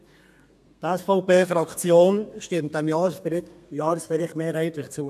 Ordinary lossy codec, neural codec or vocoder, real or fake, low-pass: none; codec, 32 kHz, 1.9 kbps, SNAC; fake; 14.4 kHz